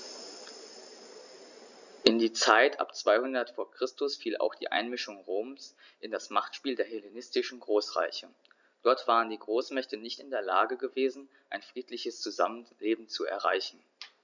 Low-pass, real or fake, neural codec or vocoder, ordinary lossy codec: 7.2 kHz; real; none; none